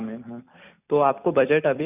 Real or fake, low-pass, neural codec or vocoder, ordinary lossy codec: fake; 3.6 kHz; vocoder, 44.1 kHz, 128 mel bands every 256 samples, BigVGAN v2; none